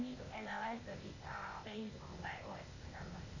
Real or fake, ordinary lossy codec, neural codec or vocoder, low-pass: fake; none; codec, 16 kHz, 0.8 kbps, ZipCodec; 7.2 kHz